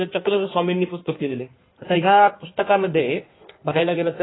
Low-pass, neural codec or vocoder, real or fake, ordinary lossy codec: 7.2 kHz; codec, 16 kHz in and 24 kHz out, 1.1 kbps, FireRedTTS-2 codec; fake; AAC, 16 kbps